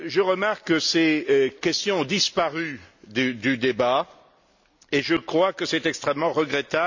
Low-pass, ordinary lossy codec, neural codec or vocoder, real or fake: 7.2 kHz; none; none; real